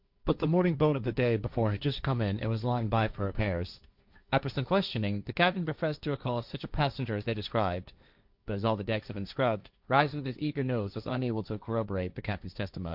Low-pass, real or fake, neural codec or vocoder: 5.4 kHz; fake; codec, 16 kHz, 1.1 kbps, Voila-Tokenizer